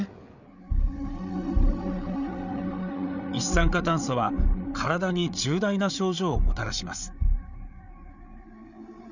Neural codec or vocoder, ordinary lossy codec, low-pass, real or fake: codec, 16 kHz, 8 kbps, FreqCodec, larger model; none; 7.2 kHz; fake